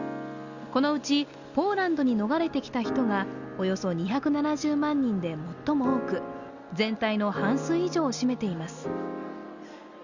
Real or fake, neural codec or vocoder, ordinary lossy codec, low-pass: real; none; Opus, 64 kbps; 7.2 kHz